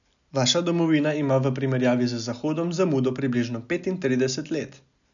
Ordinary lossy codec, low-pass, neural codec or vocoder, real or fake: none; 7.2 kHz; none; real